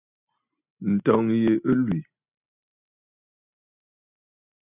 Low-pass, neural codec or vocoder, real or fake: 3.6 kHz; none; real